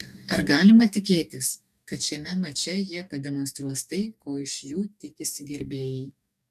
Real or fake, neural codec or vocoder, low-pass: fake; codec, 44.1 kHz, 2.6 kbps, DAC; 14.4 kHz